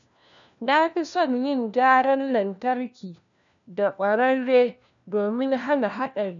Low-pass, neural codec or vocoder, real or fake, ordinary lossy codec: 7.2 kHz; codec, 16 kHz, 1 kbps, FunCodec, trained on LibriTTS, 50 frames a second; fake; none